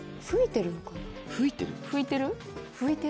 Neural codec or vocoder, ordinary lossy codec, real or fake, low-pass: none; none; real; none